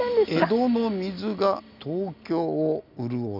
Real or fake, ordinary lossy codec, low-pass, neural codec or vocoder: real; none; 5.4 kHz; none